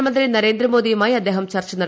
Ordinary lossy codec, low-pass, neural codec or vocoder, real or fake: none; none; none; real